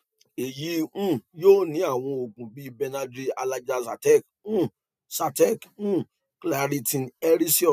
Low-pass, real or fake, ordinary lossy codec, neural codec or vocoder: 14.4 kHz; real; none; none